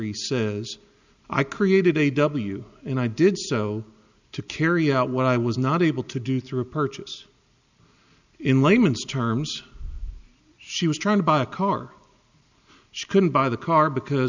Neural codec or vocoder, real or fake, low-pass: none; real; 7.2 kHz